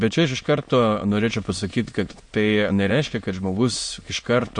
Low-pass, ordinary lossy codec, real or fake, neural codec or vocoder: 9.9 kHz; MP3, 48 kbps; fake; autoencoder, 22.05 kHz, a latent of 192 numbers a frame, VITS, trained on many speakers